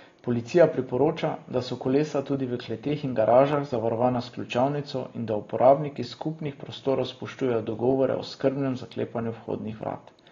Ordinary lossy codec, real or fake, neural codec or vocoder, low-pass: AAC, 32 kbps; real; none; 7.2 kHz